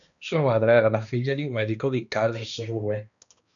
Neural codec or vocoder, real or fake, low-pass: codec, 16 kHz, 1 kbps, X-Codec, HuBERT features, trained on balanced general audio; fake; 7.2 kHz